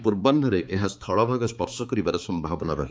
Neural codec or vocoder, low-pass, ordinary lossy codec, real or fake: codec, 16 kHz, 4 kbps, X-Codec, HuBERT features, trained on balanced general audio; none; none; fake